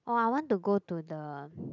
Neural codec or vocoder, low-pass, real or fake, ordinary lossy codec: none; 7.2 kHz; real; none